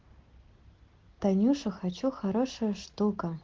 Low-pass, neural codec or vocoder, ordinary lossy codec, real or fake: 7.2 kHz; none; Opus, 16 kbps; real